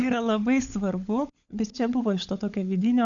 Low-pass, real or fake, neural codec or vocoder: 7.2 kHz; fake; codec, 16 kHz, 16 kbps, FunCodec, trained on LibriTTS, 50 frames a second